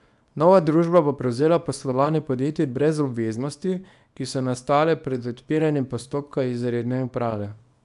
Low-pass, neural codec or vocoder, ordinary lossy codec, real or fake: 10.8 kHz; codec, 24 kHz, 0.9 kbps, WavTokenizer, small release; AAC, 96 kbps; fake